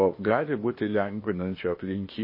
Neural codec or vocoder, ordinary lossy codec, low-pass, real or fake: codec, 16 kHz, 0.8 kbps, ZipCodec; MP3, 24 kbps; 5.4 kHz; fake